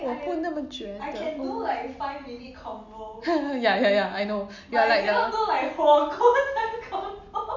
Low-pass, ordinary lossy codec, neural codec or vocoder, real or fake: 7.2 kHz; none; none; real